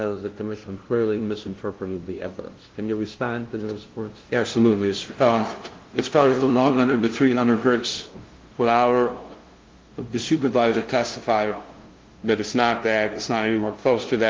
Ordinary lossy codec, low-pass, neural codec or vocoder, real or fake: Opus, 16 kbps; 7.2 kHz; codec, 16 kHz, 0.5 kbps, FunCodec, trained on LibriTTS, 25 frames a second; fake